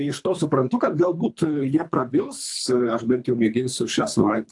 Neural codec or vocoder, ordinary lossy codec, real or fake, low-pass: codec, 24 kHz, 3 kbps, HILCodec; AAC, 64 kbps; fake; 10.8 kHz